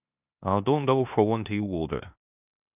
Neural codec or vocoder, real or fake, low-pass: codec, 16 kHz in and 24 kHz out, 0.9 kbps, LongCat-Audio-Codec, four codebook decoder; fake; 3.6 kHz